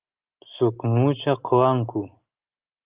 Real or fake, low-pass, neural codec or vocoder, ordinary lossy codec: real; 3.6 kHz; none; Opus, 32 kbps